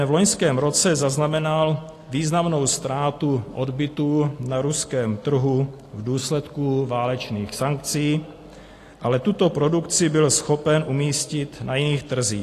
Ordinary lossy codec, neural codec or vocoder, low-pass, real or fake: AAC, 48 kbps; none; 14.4 kHz; real